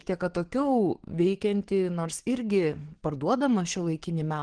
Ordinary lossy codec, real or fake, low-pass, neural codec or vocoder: Opus, 16 kbps; fake; 9.9 kHz; codec, 44.1 kHz, 7.8 kbps, DAC